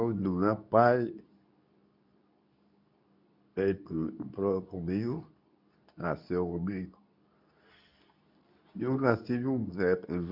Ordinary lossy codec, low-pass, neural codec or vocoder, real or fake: none; 5.4 kHz; codec, 24 kHz, 0.9 kbps, WavTokenizer, medium speech release version 2; fake